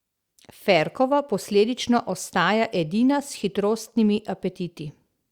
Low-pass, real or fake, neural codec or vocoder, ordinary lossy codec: 19.8 kHz; real; none; Opus, 64 kbps